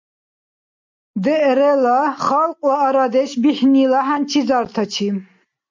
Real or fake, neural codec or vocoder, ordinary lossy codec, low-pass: real; none; MP3, 48 kbps; 7.2 kHz